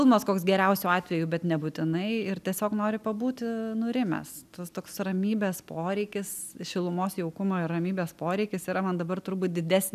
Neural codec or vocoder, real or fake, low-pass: none; real; 14.4 kHz